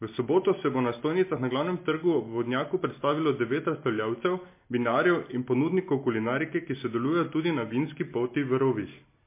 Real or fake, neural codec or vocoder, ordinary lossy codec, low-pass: real; none; MP3, 24 kbps; 3.6 kHz